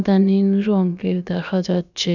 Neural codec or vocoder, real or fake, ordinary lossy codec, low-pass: codec, 16 kHz, about 1 kbps, DyCAST, with the encoder's durations; fake; none; 7.2 kHz